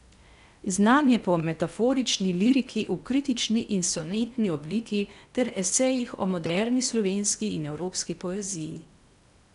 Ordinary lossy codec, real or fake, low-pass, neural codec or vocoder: none; fake; 10.8 kHz; codec, 16 kHz in and 24 kHz out, 0.8 kbps, FocalCodec, streaming, 65536 codes